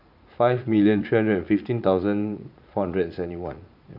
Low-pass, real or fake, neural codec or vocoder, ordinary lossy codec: 5.4 kHz; fake; vocoder, 44.1 kHz, 80 mel bands, Vocos; none